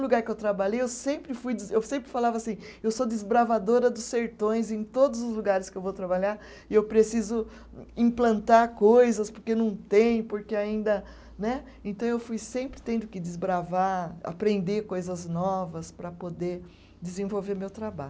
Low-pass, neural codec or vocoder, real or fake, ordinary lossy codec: none; none; real; none